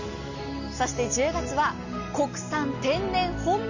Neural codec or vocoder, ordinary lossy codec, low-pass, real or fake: none; none; 7.2 kHz; real